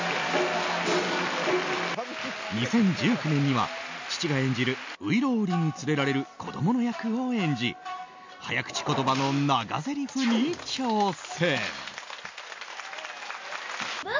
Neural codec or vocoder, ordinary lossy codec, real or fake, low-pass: none; MP3, 64 kbps; real; 7.2 kHz